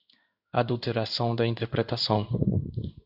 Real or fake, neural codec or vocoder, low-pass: fake; codec, 16 kHz in and 24 kHz out, 1 kbps, XY-Tokenizer; 5.4 kHz